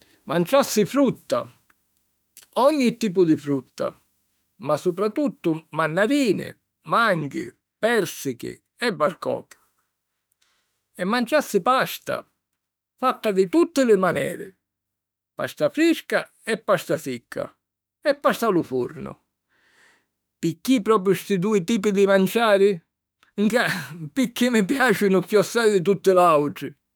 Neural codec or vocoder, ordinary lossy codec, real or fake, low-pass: autoencoder, 48 kHz, 32 numbers a frame, DAC-VAE, trained on Japanese speech; none; fake; none